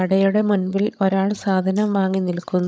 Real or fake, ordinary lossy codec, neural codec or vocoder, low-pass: fake; none; codec, 16 kHz, 16 kbps, FreqCodec, larger model; none